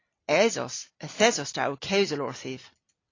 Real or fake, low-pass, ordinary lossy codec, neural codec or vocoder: real; 7.2 kHz; AAC, 32 kbps; none